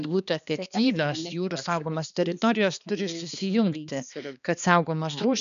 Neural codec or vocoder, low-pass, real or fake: codec, 16 kHz, 2 kbps, X-Codec, HuBERT features, trained on balanced general audio; 7.2 kHz; fake